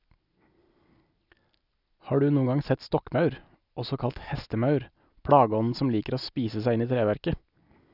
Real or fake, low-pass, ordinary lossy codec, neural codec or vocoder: real; 5.4 kHz; none; none